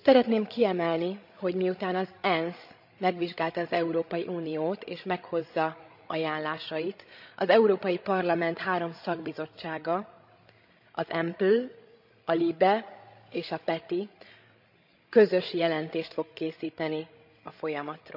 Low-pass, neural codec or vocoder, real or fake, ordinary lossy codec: 5.4 kHz; codec, 16 kHz, 16 kbps, FreqCodec, larger model; fake; none